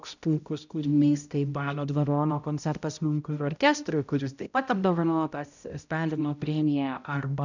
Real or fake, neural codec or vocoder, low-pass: fake; codec, 16 kHz, 0.5 kbps, X-Codec, HuBERT features, trained on balanced general audio; 7.2 kHz